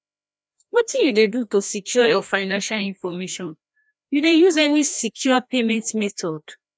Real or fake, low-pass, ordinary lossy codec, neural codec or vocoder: fake; none; none; codec, 16 kHz, 1 kbps, FreqCodec, larger model